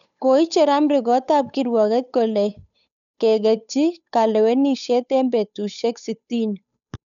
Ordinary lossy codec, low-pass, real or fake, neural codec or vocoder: none; 7.2 kHz; fake; codec, 16 kHz, 8 kbps, FunCodec, trained on Chinese and English, 25 frames a second